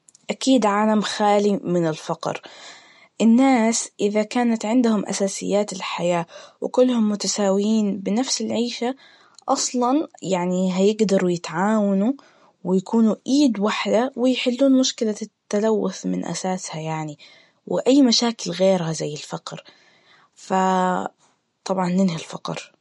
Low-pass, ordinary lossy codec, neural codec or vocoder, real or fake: 19.8 kHz; MP3, 48 kbps; none; real